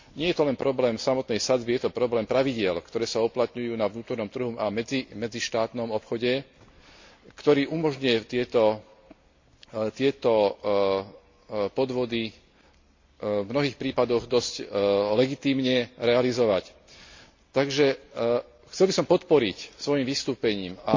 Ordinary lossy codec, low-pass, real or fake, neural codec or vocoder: MP3, 48 kbps; 7.2 kHz; real; none